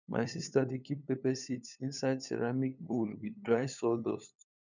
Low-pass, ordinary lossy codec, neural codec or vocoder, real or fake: 7.2 kHz; none; codec, 16 kHz, 8 kbps, FunCodec, trained on LibriTTS, 25 frames a second; fake